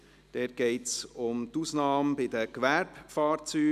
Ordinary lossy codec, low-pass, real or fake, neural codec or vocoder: none; 14.4 kHz; real; none